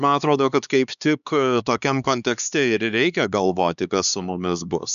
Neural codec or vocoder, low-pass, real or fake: codec, 16 kHz, 2 kbps, X-Codec, HuBERT features, trained on LibriSpeech; 7.2 kHz; fake